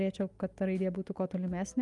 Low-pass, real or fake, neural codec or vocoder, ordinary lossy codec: 10.8 kHz; real; none; Opus, 32 kbps